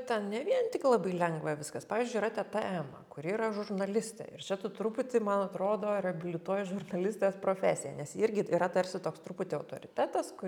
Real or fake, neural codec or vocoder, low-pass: real; none; 19.8 kHz